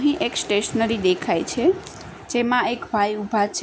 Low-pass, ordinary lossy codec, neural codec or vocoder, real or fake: none; none; none; real